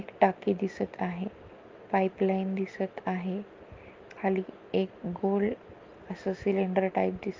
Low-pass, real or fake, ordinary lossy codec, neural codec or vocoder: 7.2 kHz; real; Opus, 32 kbps; none